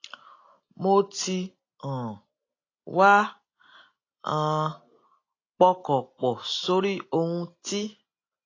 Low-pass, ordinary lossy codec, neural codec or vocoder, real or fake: 7.2 kHz; AAC, 32 kbps; none; real